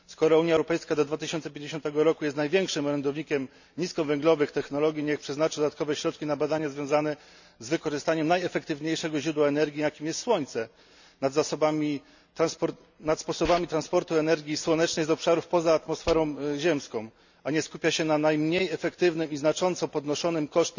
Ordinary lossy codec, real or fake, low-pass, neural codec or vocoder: none; real; 7.2 kHz; none